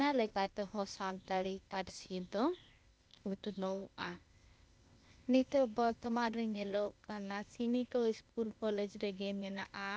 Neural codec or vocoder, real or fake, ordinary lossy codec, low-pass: codec, 16 kHz, 0.8 kbps, ZipCodec; fake; none; none